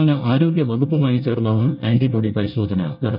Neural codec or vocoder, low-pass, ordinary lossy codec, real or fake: codec, 24 kHz, 1 kbps, SNAC; 5.4 kHz; none; fake